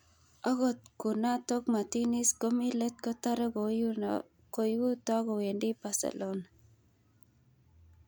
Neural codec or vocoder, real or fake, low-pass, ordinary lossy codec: none; real; none; none